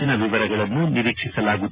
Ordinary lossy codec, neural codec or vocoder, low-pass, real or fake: Opus, 64 kbps; none; 3.6 kHz; real